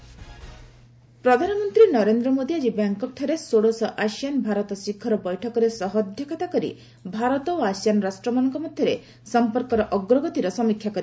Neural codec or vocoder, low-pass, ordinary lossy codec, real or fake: none; none; none; real